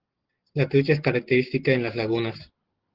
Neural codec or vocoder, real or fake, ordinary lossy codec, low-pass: none; real; Opus, 16 kbps; 5.4 kHz